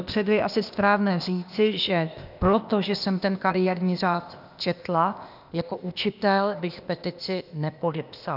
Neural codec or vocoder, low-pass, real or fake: codec, 16 kHz, 0.8 kbps, ZipCodec; 5.4 kHz; fake